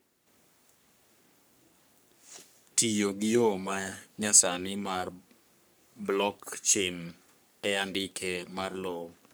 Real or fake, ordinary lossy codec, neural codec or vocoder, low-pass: fake; none; codec, 44.1 kHz, 3.4 kbps, Pupu-Codec; none